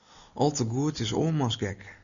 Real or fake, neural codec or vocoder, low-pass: real; none; 7.2 kHz